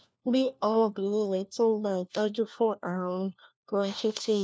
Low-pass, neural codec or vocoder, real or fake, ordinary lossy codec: none; codec, 16 kHz, 1 kbps, FunCodec, trained on LibriTTS, 50 frames a second; fake; none